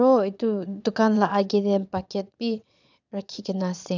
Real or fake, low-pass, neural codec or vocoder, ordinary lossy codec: fake; 7.2 kHz; vocoder, 22.05 kHz, 80 mel bands, Vocos; none